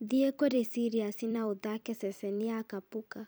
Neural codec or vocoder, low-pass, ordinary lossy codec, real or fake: vocoder, 44.1 kHz, 128 mel bands every 256 samples, BigVGAN v2; none; none; fake